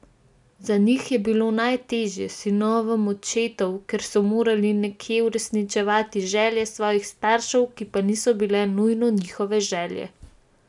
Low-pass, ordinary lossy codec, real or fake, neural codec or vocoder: 10.8 kHz; none; real; none